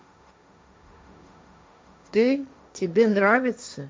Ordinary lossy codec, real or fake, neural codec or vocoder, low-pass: none; fake; codec, 16 kHz, 1.1 kbps, Voila-Tokenizer; none